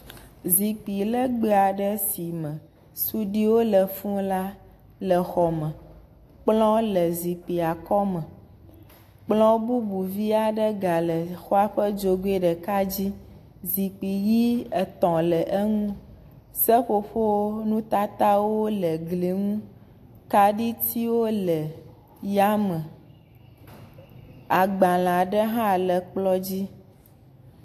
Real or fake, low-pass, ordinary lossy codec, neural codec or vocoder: real; 14.4 kHz; AAC, 64 kbps; none